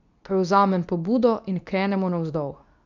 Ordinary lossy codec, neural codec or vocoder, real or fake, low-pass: none; codec, 24 kHz, 0.9 kbps, WavTokenizer, medium speech release version 2; fake; 7.2 kHz